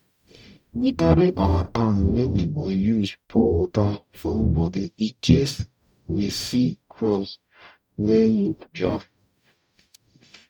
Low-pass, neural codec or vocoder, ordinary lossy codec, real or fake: 19.8 kHz; codec, 44.1 kHz, 0.9 kbps, DAC; none; fake